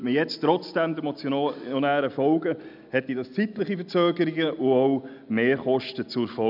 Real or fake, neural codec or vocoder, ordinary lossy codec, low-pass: real; none; none; 5.4 kHz